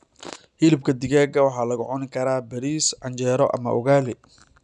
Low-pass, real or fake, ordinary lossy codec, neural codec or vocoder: 10.8 kHz; real; none; none